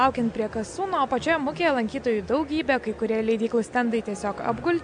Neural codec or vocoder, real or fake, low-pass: none; real; 9.9 kHz